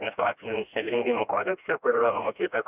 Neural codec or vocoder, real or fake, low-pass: codec, 16 kHz, 1 kbps, FreqCodec, smaller model; fake; 3.6 kHz